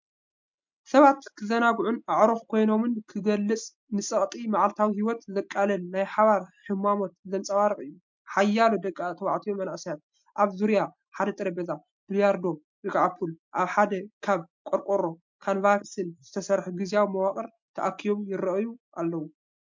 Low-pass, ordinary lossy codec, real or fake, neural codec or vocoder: 7.2 kHz; MP3, 64 kbps; real; none